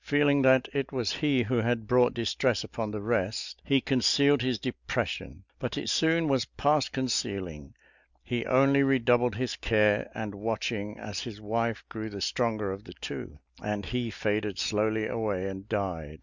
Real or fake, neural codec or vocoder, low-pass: real; none; 7.2 kHz